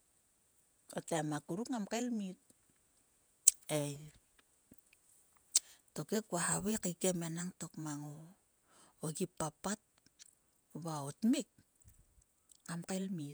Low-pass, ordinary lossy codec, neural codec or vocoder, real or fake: none; none; vocoder, 48 kHz, 128 mel bands, Vocos; fake